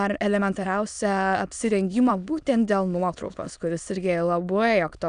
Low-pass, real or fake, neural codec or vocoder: 9.9 kHz; fake; autoencoder, 22.05 kHz, a latent of 192 numbers a frame, VITS, trained on many speakers